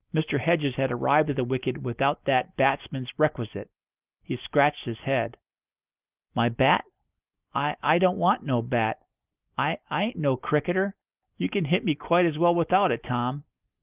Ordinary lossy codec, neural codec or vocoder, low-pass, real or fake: Opus, 24 kbps; none; 3.6 kHz; real